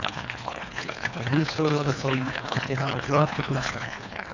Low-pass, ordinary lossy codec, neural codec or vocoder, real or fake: 7.2 kHz; none; codec, 24 kHz, 1.5 kbps, HILCodec; fake